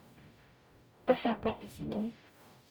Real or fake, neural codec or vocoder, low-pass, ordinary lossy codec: fake; codec, 44.1 kHz, 0.9 kbps, DAC; none; none